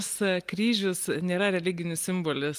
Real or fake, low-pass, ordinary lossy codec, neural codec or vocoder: real; 14.4 kHz; Opus, 24 kbps; none